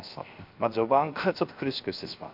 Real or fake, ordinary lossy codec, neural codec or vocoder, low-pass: fake; MP3, 48 kbps; codec, 16 kHz, 0.7 kbps, FocalCodec; 5.4 kHz